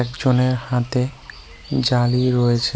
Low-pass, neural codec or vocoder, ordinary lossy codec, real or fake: none; none; none; real